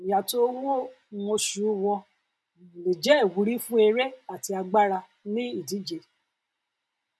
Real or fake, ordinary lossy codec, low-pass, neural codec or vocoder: real; none; none; none